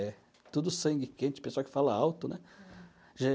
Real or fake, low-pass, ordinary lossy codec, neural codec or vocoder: real; none; none; none